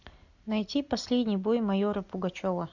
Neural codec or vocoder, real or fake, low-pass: none; real; 7.2 kHz